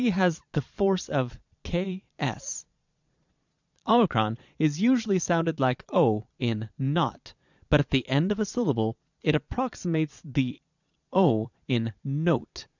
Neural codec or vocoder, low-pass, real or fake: vocoder, 22.05 kHz, 80 mel bands, Vocos; 7.2 kHz; fake